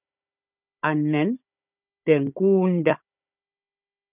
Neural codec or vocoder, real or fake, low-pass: codec, 16 kHz, 16 kbps, FunCodec, trained on Chinese and English, 50 frames a second; fake; 3.6 kHz